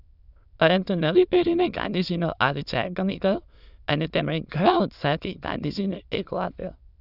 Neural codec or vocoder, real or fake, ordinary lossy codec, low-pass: autoencoder, 22.05 kHz, a latent of 192 numbers a frame, VITS, trained on many speakers; fake; none; 5.4 kHz